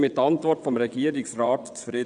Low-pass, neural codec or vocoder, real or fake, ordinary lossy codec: 9.9 kHz; vocoder, 22.05 kHz, 80 mel bands, WaveNeXt; fake; none